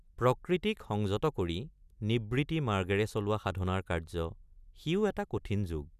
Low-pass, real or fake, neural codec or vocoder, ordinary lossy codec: 14.4 kHz; real; none; none